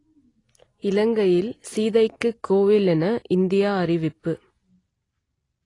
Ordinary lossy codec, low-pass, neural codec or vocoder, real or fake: AAC, 32 kbps; 10.8 kHz; none; real